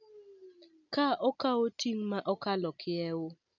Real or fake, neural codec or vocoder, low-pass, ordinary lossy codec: real; none; 7.2 kHz; none